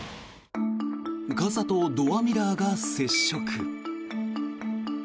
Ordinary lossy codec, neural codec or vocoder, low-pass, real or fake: none; none; none; real